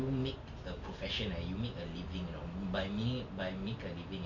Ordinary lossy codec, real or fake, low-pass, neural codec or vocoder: none; real; 7.2 kHz; none